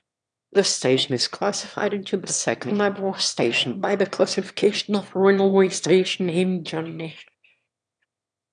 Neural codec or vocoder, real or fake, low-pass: autoencoder, 22.05 kHz, a latent of 192 numbers a frame, VITS, trained on one speaker; fake; 9.9 kHz